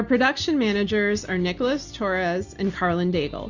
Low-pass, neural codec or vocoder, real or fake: 7.2 kHz; none; real